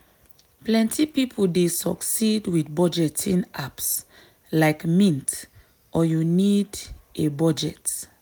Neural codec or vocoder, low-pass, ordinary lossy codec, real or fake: none; none; none; real